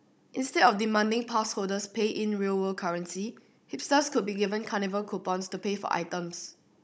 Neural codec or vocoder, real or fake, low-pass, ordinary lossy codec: codec, 16 kHz, 16 kbps, FunCodec, trained on Chinese and English, 50 frames a second; fake; none; none